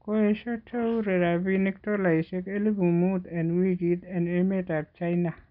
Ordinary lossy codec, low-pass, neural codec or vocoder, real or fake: none; 5.4 kHz; none; real